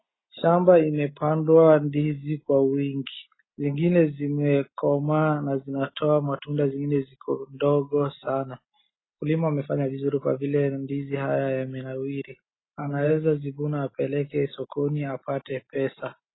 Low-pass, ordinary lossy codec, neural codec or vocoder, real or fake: 7.2 kHz; AAC, 16 kbps; none; real